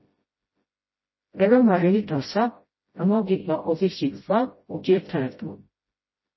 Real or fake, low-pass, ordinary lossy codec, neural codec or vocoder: fake; 7.2 kHz; MP3, 24 kbps; codec, 16 kHz, 0.5 kbps, FreqCodec, smaller model